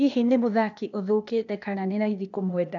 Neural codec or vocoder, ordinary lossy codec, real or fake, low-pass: codec, 16 kHz, 0.8 kbps, ZipCodec; none; fake; 7.2 kHz